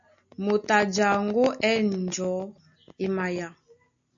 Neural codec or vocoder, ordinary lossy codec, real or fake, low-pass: none; MP3, 64 kbps; real; 7.2 kHz